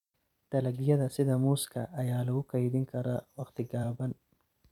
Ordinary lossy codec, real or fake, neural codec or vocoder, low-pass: none; fake; vocoder, 44.1 kHz, 128 mel bands every 256 samples, BigVGAN v2; 19.8 kHz